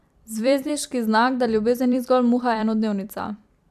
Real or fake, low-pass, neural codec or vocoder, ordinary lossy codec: fake; 14.4 kHz; vocoder, 44.1 kHz, 128 mel bands every 256 samples, BigVGAN v2; AAC, 96 kbps